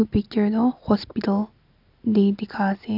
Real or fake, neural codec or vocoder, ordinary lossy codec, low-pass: real; none; AAC, 48 kbps; 5.4 kHz